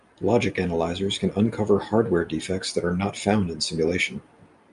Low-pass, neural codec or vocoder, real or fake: 10.8 kHz; none; real